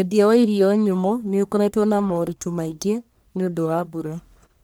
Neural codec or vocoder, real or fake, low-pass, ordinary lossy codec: codec, 44.1 kHz, 1.7 kbps, Pupu-Codec; fake; none; none